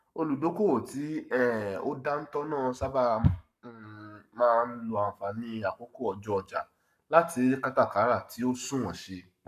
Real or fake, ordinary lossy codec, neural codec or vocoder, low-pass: fake; none; codec, 44.1 kHz, 7.8 kbps, Pupu-Codec; 14.4 kHz